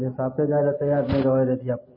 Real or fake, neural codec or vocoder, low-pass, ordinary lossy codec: real; none; 5.4 kHz; MP3, 24 kbps